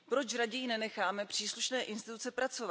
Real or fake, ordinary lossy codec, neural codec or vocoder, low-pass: real; none; none; none